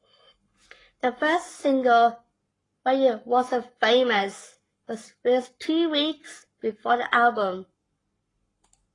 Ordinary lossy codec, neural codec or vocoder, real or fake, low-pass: AAC, 32 kbps; codec, 44.1 kHz, 7.8 kbps, Pupu-Codec; fake; 10.8 kHz